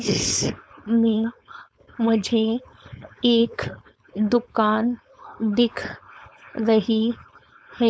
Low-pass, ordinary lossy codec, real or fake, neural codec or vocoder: none; none; fake; codec, 16 kHz, 4.8 kbps, FACodec